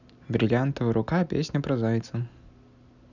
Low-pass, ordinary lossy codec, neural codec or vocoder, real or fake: 7.2 kHz; none; none; real